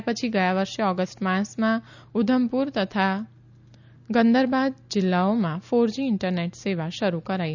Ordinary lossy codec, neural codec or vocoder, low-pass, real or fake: none; none; 7.2 kHz; real